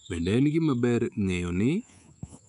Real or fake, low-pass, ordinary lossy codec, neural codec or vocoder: fake; 10.8 kHz; none; codec, 24 kHz, 3.1 kbps, DualCodec